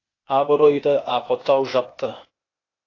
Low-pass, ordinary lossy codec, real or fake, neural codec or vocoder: 7.2 kHz; AAC, 32 kbps; fake; codec, 16 kHz, 0.8 kbps, ZipCodec